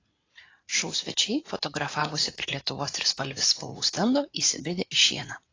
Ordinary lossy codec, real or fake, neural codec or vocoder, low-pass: AAC, 32 kbps; fake; vocoder, 44.1 kHz, 80 mel bands, Vocos; 7.2 kHz